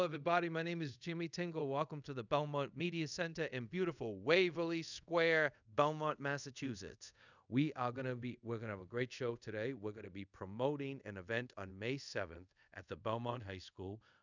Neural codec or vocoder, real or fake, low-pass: codec, 24 kHz, 0.5 kbps, DualCodec; fake; 7.2 kHz